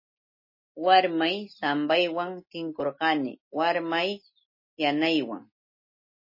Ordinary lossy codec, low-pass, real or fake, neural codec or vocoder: MP3, 24 kbps; 5.4 kHz; real; none